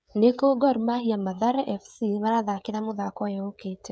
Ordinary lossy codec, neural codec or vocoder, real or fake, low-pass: none; codec, 16 kHz, 16 kbps, FreqCodec, smaller model; fake; none